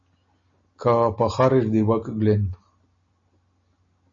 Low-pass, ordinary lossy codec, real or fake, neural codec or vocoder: 7.2 kHz; MP3, 32 kbps; real; none